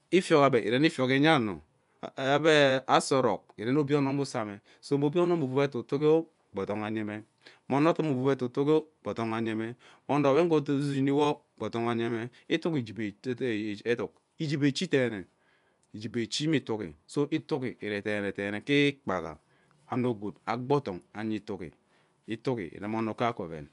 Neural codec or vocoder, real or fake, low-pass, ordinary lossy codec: vocoder, 24 kHz, 100 mel bands, Vocos; fake; 10.8 kHz; none